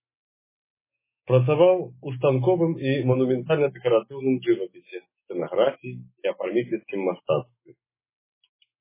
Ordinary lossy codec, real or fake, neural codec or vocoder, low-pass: MP3, 16 kbps; fake; autoencoder, 48 kHz, 128 numbers a frame, DAC-VAE, trained on Japanese speech; 3.6 kHz